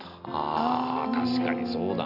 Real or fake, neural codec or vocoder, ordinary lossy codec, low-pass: real; none; none; 5.4 kHz